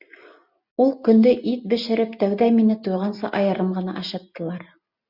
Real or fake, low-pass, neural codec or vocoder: real; 5.4 kHz; none